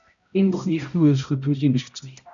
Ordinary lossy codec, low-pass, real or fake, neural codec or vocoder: MP3, 96 kbps; 7.2 kHz; fake; codec, 16 kHz, 0.5 kbps, X-Codec, HuBERT features, trained on balanced general audio